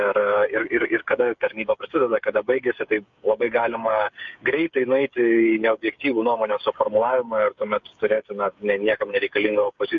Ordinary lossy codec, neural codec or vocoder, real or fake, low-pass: MP3, 48 kbps; codec, 16 kHz, 8 kbps, FreqCodec, smaller model; fake; 7.2 kHz